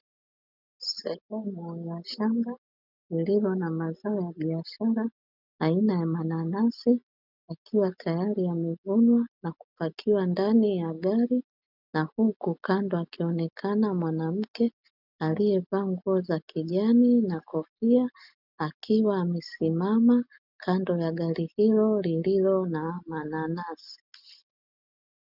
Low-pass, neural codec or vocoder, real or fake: 5.4 kHz; none; real